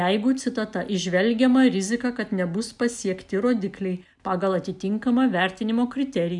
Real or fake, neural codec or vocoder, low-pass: real; none; 10.8 kHz